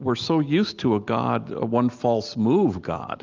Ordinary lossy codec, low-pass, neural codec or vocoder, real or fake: Opus, 32 kbps; 7.2 kHz; none; real